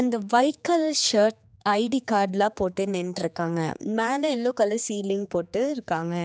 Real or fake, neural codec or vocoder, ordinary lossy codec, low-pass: fake; codec, 16 kHz, 4 kbps, X-Codec, HuBERT features, trained on general audio; none; none